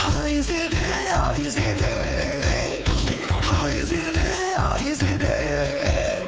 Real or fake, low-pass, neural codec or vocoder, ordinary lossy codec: fake; none; codec, 16 kHz, 2 kbps, X-Codec, WavLM features, trained on Multilingual LibriSpeech; none